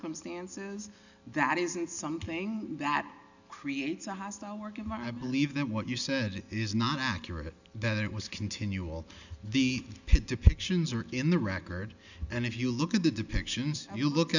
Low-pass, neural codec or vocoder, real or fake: 7.2 kHz; none; real